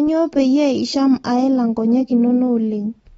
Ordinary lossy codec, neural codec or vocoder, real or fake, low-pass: AAC, 24 kbps; none; real; 19.8 kHz